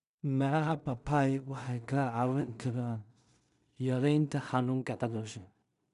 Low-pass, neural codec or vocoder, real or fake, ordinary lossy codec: 10.8 kHz; codec, 16 kHz in and 24 kHz out, 0.4 kbps, LongCat-Audio-Codec, two codebook decoder; fake; none